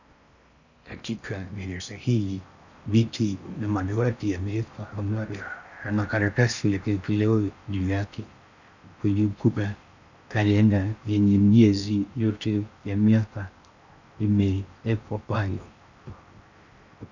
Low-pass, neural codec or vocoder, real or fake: 7.2 kHz; codec, 16 kHz in and 24 kHz out, 0.6 kbps, FocalCodec, streaming, 4096 codes; fake